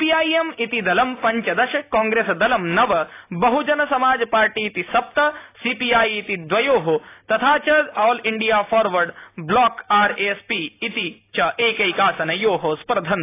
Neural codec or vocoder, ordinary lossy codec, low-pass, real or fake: none; AAC, 24 kbps; 3.6 kHz; real